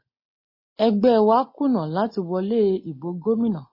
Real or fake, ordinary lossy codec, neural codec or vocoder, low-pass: real; MP3, 24 kbps; none; 5.4 kHz